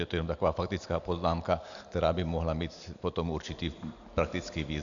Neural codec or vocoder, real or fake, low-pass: none; real; 7.2 kHz